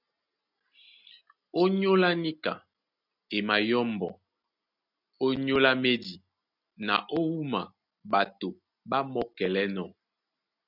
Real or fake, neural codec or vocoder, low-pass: real; none; 5.4 kHz